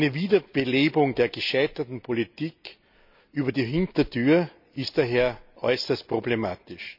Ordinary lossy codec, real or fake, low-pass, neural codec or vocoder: none; real; 5.4 kHz; none